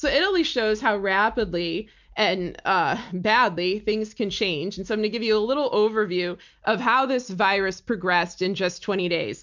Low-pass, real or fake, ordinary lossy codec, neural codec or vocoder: 7.2 kHz; real; MP3, 64 kbps; none